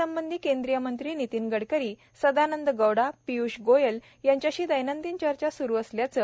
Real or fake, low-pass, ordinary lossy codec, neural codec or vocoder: real; none; none; none